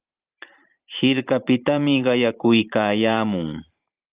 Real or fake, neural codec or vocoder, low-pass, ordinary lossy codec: fake; vocoder, 44.1 kHz, 128 mel bands every 512 samples, BigVGAN v2; 3.6 kHz; Opus, 32 kbps